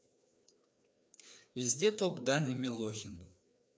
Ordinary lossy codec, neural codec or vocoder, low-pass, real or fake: none; codec, 16 kHz, 2 kbps, FreqCodec, larger model; none; fake